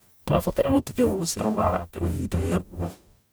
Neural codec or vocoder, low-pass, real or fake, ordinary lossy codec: codec, 44.1 kHz, 0.9 kbps, DAC; none; fake; none